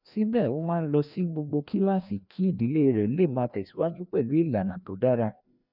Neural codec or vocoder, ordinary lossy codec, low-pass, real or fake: codec, 16 kHz, 1 kbps, FreqCodec, larger model; none; 5.4 kHz; fake